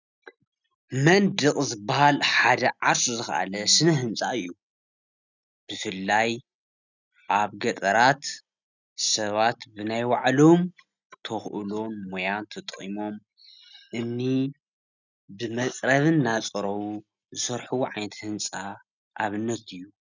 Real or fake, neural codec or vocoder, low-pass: real; none; 7.2 kHz